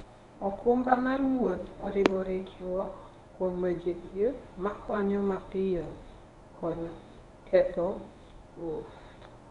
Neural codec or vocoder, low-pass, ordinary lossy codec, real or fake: codec, 24 kHz, 0.9 kbps, WavTokenizer, medium speech release version 1; 10.8 kHz; none; fake